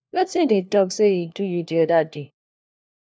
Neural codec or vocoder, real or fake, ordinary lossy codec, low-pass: codec, 16 kHz, 1 kbps, FunCodec, trained on LibriTTS, 50 frames a second; fake; none; none